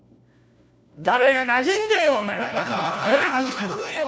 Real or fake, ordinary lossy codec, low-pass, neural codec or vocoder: fake; none; none; codec, 16 kHz, 1 kbps, FunCodec, trained on LibriTTS, 50 frames a second